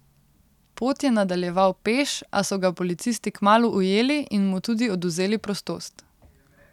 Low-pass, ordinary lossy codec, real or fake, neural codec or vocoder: 19.8 kHz; none; real; none